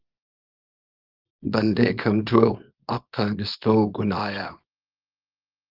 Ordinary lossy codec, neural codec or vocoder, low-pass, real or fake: Opus, 32 kbps; codec, 24 kHz, 0.9 kbps, WavTokenizer, small release; 5.4 kHz; fake